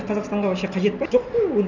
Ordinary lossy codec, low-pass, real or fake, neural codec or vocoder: none; 7.2 kHz; real; none